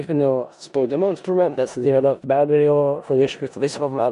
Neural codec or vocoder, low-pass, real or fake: codec, 16 kHz in and 24 kHz out, 0.4 kbps, LongCat-Audio-Codec, four codebook decoder; 10.8 kHz; fake